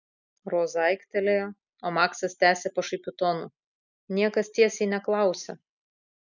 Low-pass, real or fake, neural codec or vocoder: 7.2 kHz; real; none